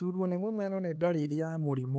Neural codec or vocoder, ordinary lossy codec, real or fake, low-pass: codec, 16 kHz, 2 kbps, X-Codec, HuBERT features, trained on LibriSpeech; none; fake; none